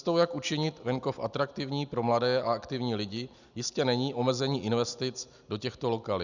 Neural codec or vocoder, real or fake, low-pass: none; real; 7.2 kHz